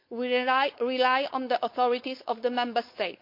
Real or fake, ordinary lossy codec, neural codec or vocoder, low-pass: fake; MP3, 32 kbps; codec, 16 kHz, 4.8 kbps, FACodec; 5.4 kHz